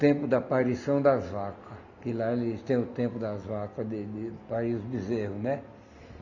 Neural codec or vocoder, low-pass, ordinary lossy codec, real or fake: none; 7.2 kHz; none; real